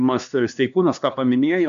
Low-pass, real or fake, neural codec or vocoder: 7.2 kHz; fake; codec, 16 kHz, 2 kbps, X-Codec, HuBERT features, trained on LibriSpeech